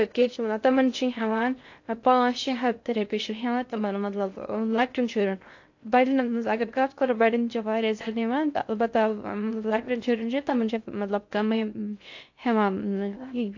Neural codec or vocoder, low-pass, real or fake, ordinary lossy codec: codec, 16 kHz in and 24 kHz out, 0.6 kbps, FocalCodec, streaming, 2048 codes; 7.2 kHz; fake; AAC, 48 kbps